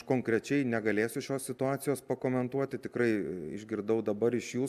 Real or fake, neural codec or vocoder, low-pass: real; none; 14.4 kHz